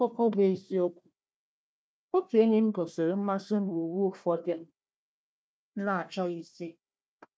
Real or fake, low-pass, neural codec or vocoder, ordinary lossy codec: fake; none; codec, 16 kHz, 1 kbps, FunCodec, trained on Chinese and English, 50 frames a second; none